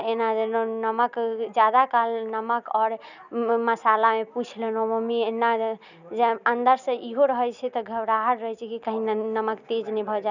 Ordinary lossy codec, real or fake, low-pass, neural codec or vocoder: none; real; 7.2 kHz; none